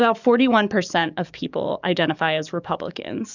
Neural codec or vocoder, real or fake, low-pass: codec, 44.1 kHz, 7.8 kbps, DAC; fake; 7.2 kHz